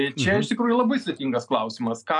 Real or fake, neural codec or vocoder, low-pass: real; none; 10.8 kHz